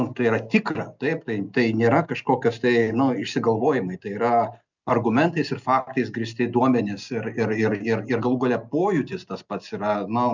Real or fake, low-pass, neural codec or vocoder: real; 7.2 kHz; none